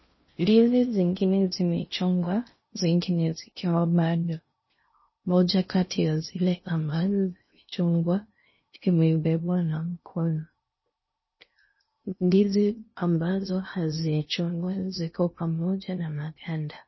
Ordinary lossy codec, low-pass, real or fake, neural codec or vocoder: MP3, 24 kbps; 7.2 kHz; fake; codec, 16 kHz in and 24 kHz out, 0.6 kbps, FocalCodec, streaming, 4096 codes